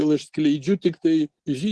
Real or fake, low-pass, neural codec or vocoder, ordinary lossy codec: real; 10.8 kHz; none; Opus, 16 kbps